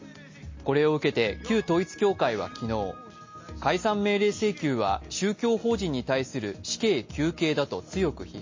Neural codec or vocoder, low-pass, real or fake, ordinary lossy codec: none; 7.2 kHz; real; MP3, 32 kbps